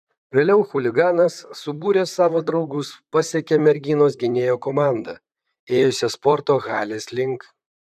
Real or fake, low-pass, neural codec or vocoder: fake; 14.4 kHz; vocoder, 44.1 kHz, 128 mel bands, Pupu-Vocoder